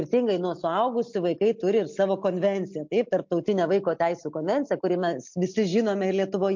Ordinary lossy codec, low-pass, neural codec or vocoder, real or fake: MP3, 48 kbps; 7.2 kHz; none; real